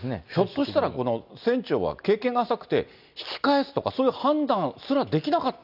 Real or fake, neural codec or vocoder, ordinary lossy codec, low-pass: real; none; none; 5.4 kHz